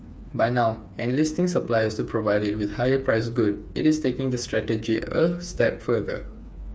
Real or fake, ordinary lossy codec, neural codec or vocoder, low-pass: fake; none; codec, 16 kHz, 4 kbps, FreqCodec, smaller model; none